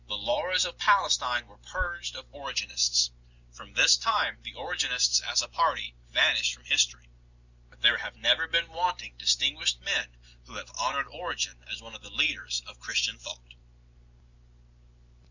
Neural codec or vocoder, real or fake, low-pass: none; real; 7.2 kHz